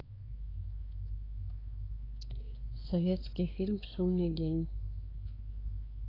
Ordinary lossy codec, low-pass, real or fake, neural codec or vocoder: AAC, 24 kbps; 5.4 kHz; fake; codec, 16 kHz, 4 kbps, X-Codec, WavLM features, trained on Multilingual LibriSpeech